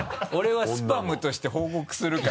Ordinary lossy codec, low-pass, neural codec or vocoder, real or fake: none; none; none; real